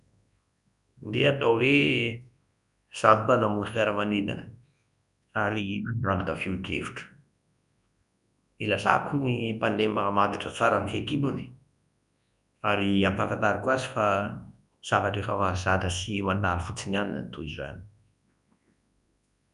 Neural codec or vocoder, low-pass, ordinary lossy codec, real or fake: codec, 24 kHz, 0.9 kbps, WavTokenizer, large speech release; 10.8 kHz; none; fake